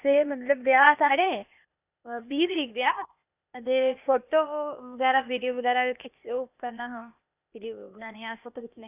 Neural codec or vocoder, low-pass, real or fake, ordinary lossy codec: codec, 16 kHz, 0.8 kbps, ZipCodec; 3.6 kHz; fake; none